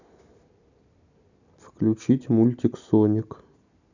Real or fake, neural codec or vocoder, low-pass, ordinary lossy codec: real; none; 7.2 kHz; none